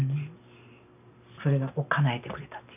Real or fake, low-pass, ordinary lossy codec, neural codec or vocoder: real; 3.6 kHz; none; none